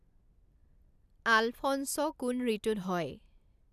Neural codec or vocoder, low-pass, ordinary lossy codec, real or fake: none; 14.4 kHz; none; real